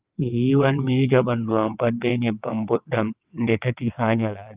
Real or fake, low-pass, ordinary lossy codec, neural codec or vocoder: fake; 3.6 kHz; Opus, 24 kbps; vocoder, 22.05 kHz, 80 mel bands, WaveNeXt